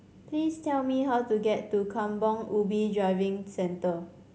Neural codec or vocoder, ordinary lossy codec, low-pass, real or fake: none; none; none; real